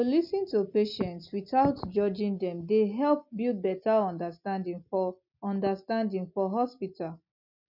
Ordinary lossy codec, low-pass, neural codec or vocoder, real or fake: none; 5.4 kHz; none; real